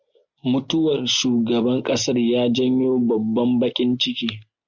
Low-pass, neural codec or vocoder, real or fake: 7.2 kHz; none; real